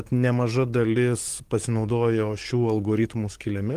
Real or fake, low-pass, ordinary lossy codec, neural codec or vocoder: fake; 14.4 kHz; Opus, 24 kbps; codec, 44.1 kHz, 7.8 kbps, DAC